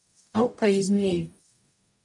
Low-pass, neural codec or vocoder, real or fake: 10.8 kHz; codec, 44.1 kHz, 0.9 kbps, DAC; fake